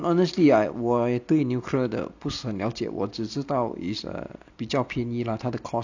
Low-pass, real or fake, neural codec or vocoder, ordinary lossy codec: 7.2 kHz; real; none; none